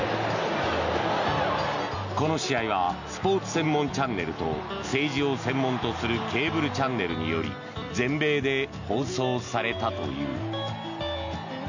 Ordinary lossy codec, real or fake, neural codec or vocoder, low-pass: none; real; none; 7.2 kHz